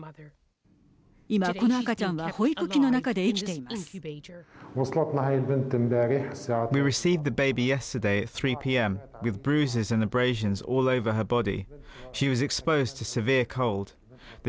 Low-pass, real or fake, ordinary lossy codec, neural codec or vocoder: none; real; none; none